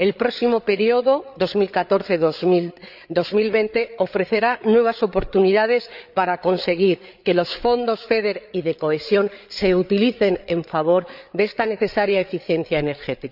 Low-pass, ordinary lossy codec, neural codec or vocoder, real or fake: 5.4 kHz; none; codec, 16 kHz, 8 kbps, FreqCodec, larger model; fake